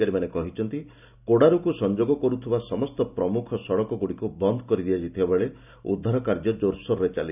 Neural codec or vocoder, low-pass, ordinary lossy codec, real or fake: none; 3.6 kHz; none; real